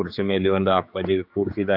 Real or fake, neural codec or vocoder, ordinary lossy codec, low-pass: fake; codec, 16 kHz, 4 kbps, X-Codec, HuBERT features, trained on general audio; none; 5.4 kHz